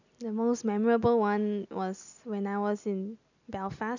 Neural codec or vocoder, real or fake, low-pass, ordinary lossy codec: none; real; 7.2 kHz; none